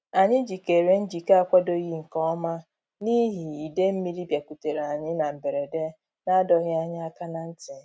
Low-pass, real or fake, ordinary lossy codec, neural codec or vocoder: none; real; none; none